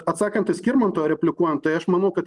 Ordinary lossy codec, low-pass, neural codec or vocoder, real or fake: Opus, 32 kbps; 10.8 kHz; none; real